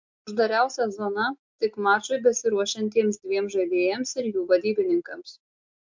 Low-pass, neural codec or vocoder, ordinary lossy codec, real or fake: 7.2 kHz; none; MP3, 48 kbps; real